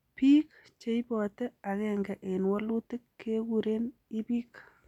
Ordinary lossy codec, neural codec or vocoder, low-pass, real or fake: none; none; 19.8 kHz; real